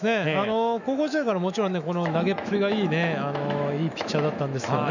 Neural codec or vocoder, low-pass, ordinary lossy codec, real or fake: autoencoder, 48 kHz, 128 numbers a frame, DAC-VAE, trained on Japanese speech; 7.2 kHz; none; fake